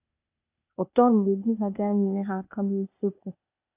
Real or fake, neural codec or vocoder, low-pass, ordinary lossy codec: fake; codec, 16 kHz, 0.8 kbps, ZipCodec; 3.6 kHz; AAC, 32 kbps